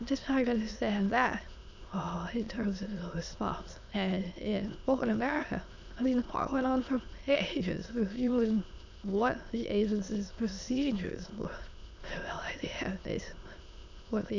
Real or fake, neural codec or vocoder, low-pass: fake; autoencoder, 22.05 kHz, a latent of 192 numbers a frame, VITS, trained on many speakers; 7.2 kHz